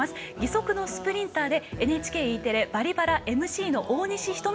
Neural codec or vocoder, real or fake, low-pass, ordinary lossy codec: none; real; none; none